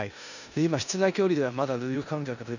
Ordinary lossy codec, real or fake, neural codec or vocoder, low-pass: none; fake; codec, 16 kHz in and 24 kHz out, 0.9 kbps, LongCat-Audio-Codec, four codebook decoder; 7.2 kHz